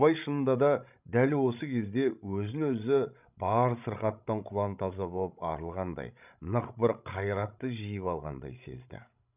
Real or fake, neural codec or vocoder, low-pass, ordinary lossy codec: fake; codec, 16 kHz, 16 kbps, FreqCodec, larger model; 3.6 kHz; none